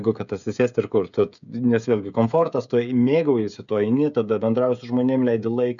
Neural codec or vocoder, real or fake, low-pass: codec, 16 kHz, 16 kbps, FreqCodec, smaller model; fake; 7.2 kHz